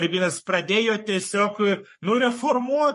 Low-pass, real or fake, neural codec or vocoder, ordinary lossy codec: 14.4 kHz; fake; codec, 44.1 kHz, 3.4 kbps, Pupu-Codec; MP3, 48 kbps